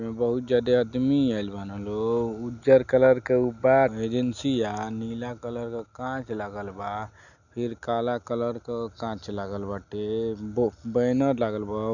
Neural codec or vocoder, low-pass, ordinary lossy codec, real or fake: none; 7.2 kHz; none; real